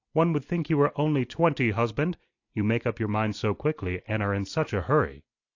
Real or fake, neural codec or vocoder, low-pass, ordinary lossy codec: real; none; 7.2 kHz; AAC, 48 kbps